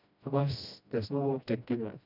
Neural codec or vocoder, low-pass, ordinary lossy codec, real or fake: codec, 16 kHz, 1 kbps, FreqCodec, smaller model; 5.4 kHz; none; fake